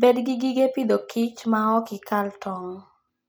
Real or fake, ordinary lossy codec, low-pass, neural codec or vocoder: real; none; none; none